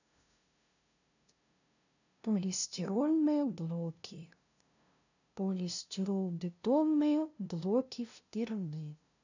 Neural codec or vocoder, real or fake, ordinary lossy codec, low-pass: codec, 16 kHz, 0.5 kbps, FunCodec, trained on LibriTTS, 25 frames a second; fake; none; 7.2 kHz